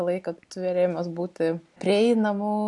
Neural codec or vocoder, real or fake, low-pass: none; real; 10.8 kHz